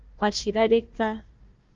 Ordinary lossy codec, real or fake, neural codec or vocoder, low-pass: Opus, 32 kbps; fake; codec, 16 kHz, 1 kbps, FunCodec, trained on Chinese and English, 50 frames a second; 7.2 kHz